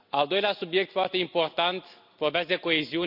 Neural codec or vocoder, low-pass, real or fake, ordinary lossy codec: none; 5.4 kHz; real; none